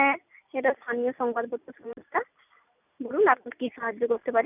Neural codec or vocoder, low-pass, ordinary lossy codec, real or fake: none; 3.6 kHz; none; real